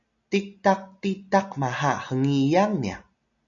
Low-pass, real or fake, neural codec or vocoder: 7.2 kHz; real; none